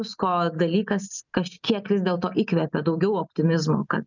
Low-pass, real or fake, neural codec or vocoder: 7.2 kHz; real; none